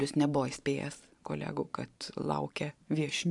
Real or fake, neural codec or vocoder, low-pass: fake; vocoder, 44.1 kHz, 128 mel bands every 512 samples, BigVGAN v2; 10.8 kHz